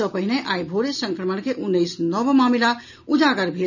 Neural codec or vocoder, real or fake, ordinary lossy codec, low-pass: none; real; none; 7.2 kHz